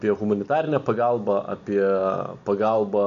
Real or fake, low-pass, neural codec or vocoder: real; 7.2 kHz; none